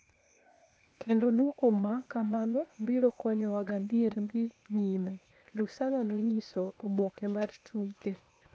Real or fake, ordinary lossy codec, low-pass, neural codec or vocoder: fake; none; none; codec, 16 kHz, 0.8 kbps, ZipCodec